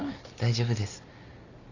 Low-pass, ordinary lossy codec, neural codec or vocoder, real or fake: 7.2 kHz; Opus, 64 kbps; none; real